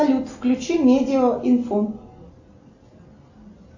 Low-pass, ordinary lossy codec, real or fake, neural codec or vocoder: 7.2 kHz; AAC, 48 kbps; real; none